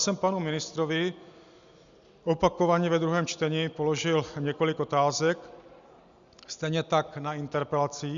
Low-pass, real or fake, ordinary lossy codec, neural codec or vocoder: 7.2 kHz; real; Opus, 64 kbps; none